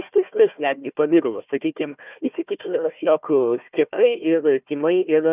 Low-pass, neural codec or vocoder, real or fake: 3.6 kHz; codec, 16 kHz, 1 kbps, FunCodec, trained on Chinese and English, 50 frames a second; fake